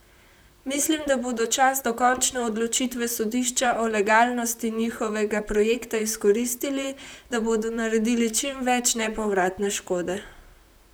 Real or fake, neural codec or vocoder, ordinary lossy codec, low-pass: fake; vocoder, 44.1 kHz, 128 mel bands, Pupu-Vocoder; none; none